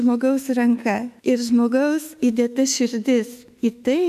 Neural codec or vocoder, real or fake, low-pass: autoencoder, 48 kHz, 32 numbers a frame, DAC-VAE, trained on Japanese speech; fake; 14.4 kHz